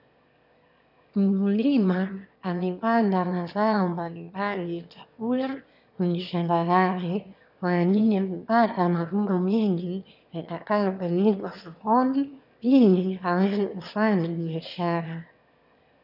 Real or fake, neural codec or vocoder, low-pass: fake; autoencoder, 22.05 kHz, a latent of 192 numbers a frame, VITS, trained on one speaker; 5.4 kHz